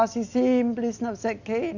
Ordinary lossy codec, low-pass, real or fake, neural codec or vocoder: none; 7.2 kHz; real; none